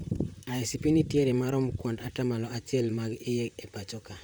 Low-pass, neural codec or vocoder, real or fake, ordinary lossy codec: none; vocoder, 44.1 kHz, 128 mel bands, Pupu-Vocoder; fake; none